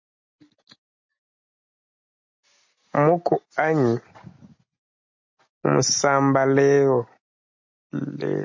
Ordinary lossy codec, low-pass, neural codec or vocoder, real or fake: MP3, 48 kbps; 7.2 kHz; none; real